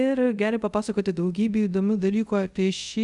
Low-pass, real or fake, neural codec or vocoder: 10.8 kHz; fake; codec, 24 kHz, 0.5 kbps, DualCodec